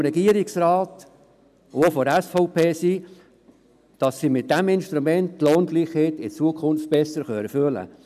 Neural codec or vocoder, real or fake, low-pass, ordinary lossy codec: none; real; 14.4 kHz; none